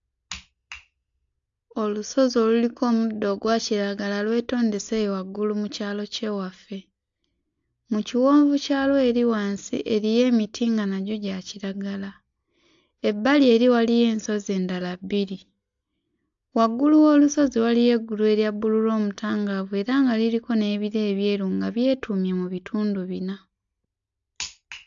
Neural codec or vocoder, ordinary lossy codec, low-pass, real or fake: none; AAC, 64 kbps; 7.2 kHz; real